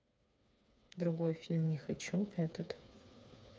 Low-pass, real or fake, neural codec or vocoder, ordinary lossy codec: none; fake; codec, 16 kHz, 4 kbps, FreqCodec, smaller model; none